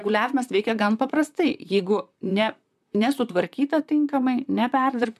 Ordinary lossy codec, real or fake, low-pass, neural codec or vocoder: MP3, 96 kbps; fake; 14.4 kHz; vocoder, 44.1 kHz, 128 mel bands, Pupu-Vocoder